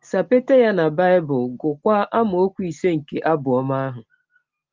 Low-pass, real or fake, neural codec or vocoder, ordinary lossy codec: 7.2 kHz; real; none; Opus, 24 kbps